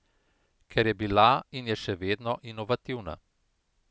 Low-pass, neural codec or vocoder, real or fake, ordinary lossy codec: none; none; real; none